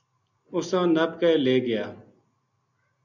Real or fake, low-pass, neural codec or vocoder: real; 7.2 kHz; none